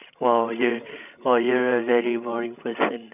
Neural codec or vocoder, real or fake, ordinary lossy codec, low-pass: codec, 16 kHz, 16 kbps, FreqCodec, larger model; fake; none; 3.6 kHz